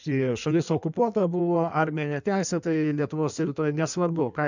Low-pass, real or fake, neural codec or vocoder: 7.2 kHz; fake; codec, 16 kHz in and 24 kHz out, 1.1 kbps, FireRedTTS-2 codec